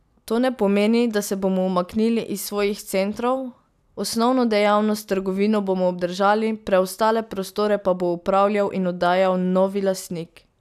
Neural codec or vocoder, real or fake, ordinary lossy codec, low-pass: none; real; none; 14.4 kHz